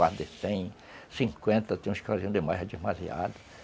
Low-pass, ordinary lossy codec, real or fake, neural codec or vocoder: none; none; real; none